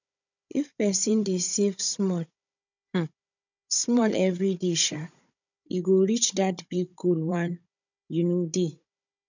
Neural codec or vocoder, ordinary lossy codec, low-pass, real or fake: codec, 16 kHz, 16 kbps, FunCodec, trained on Chinese and English, 50 frames a second; none; 7.2 kHz; fake